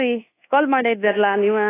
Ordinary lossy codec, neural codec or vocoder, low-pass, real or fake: AAC, 16 kbps; codec, 24 kHz, 1.2 kbps, DualCodec; 3.6 kHz; fake